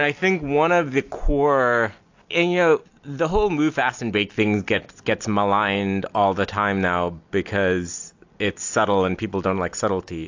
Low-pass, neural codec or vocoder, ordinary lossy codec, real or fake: 7.2 kHz; none; AAC, 48 kbps; real